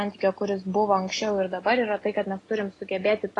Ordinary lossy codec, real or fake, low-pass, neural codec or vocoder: AAC, 32 kbps; real; 10.8 kHz; none